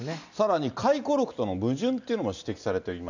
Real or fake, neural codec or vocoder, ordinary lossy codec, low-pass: real; none; none; 7.2 kHz